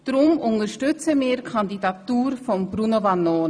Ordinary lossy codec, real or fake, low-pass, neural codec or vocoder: none; real; none; none